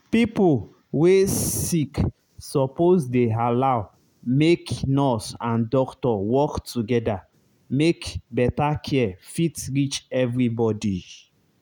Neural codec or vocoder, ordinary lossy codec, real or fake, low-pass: none; none; real; none